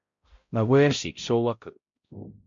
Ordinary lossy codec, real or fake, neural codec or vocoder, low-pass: MP3, 48 kbps; fake; codec, 16 kHz, 0.5 kbps, X-Codec, HuBERT features, trained on balanced general audio; 7.2 kHz